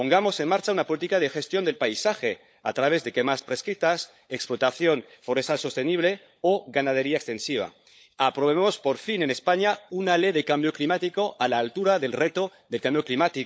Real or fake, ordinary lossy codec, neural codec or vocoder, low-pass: fake; none; codec, 16 kHz, 16 kbps, FunCodec, trained on LibriTTS, 50 frames a second; none